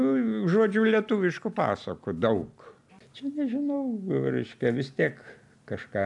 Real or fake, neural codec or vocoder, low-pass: real; none; 10.8 kHz